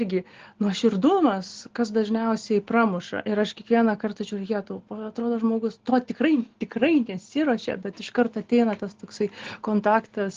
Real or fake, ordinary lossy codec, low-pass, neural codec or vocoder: real; Opus, 16 kbps; 7.2 kHz; none